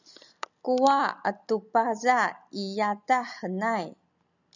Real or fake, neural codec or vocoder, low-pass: real; none; 7.2 kHz